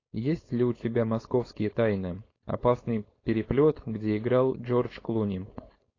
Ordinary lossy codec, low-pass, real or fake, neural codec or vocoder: AAC, 32 kbps; 7.2 kHz; fake; codec, 16 kHz, 4.8 kbps, FACodec